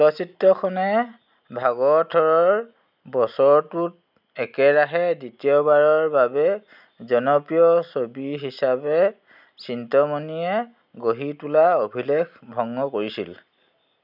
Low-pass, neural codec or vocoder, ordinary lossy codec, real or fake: 5.4 kHz; none; none; real